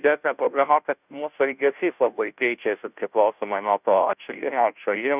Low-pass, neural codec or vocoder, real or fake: 3.6 kHz; codec, 16 kHz, 0.5 kbps, FunCodec, trained on Chinese and English, 25 frames a second; fake